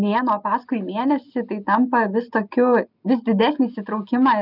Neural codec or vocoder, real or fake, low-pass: none; real; 5.4 kHz